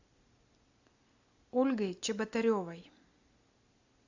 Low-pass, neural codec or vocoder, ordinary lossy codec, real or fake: 7.2 kHz; none; AAC, 48 kbps; real